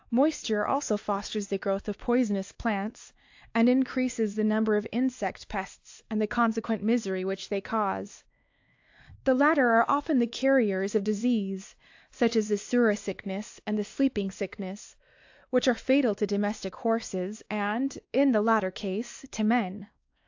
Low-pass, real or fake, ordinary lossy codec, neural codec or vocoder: 7.2 kHz; fake; AAC, 48 kbps; codec, 16 kHz, 2 kbps, X-Codec, WavLM features, trained on Multilingual LibriSpeech